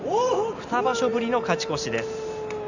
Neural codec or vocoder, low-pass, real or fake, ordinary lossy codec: none; 7.2 kHz; real; none